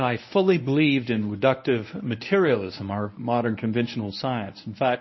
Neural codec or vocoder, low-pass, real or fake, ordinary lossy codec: codec, 24 kHz, 0.9 kbps, WavTokenizer, medium speech release version 1; 7.2 kHz; fake; MP3, 24 kbps